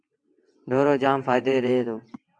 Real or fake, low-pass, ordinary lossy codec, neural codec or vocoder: fake; 9.9 kHz; AAC, 64 kbps; vocoder, 22.05 kHz, 80 mel bands, WaveNeXt